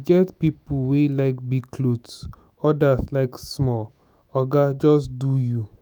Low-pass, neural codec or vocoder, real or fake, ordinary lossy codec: none; autoencoder, 48 kHz, 128 numbers a frame, DAC-VAE, trained on Japanese speech; fake; none